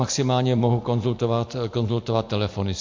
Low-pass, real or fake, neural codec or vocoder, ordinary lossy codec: 7.2 kHz; real; none; MP3, 48 kbps